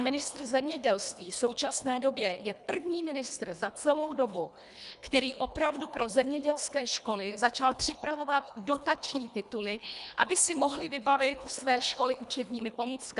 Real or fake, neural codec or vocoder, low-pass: fake; codec, 24 kHz, 1.5 kbps, HILCodec; 10.8 kHz